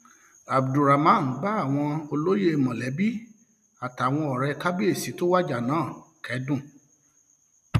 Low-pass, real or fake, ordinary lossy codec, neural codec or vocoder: 14.4 kHz; real; none; none